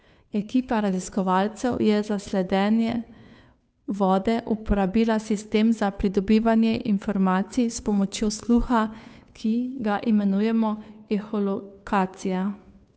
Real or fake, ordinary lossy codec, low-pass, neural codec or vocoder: fake; none; none; codec, 16 kHz, 2 kbps, FunCodec, trained on Chinese and English, 25 frames a second